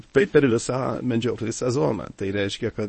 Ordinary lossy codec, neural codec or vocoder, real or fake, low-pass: MP3, 32 kbps; codec, 24 kHz, 0.9 kbps, WavTokenizer, medium speech release version 1; fake; 10.8 kHz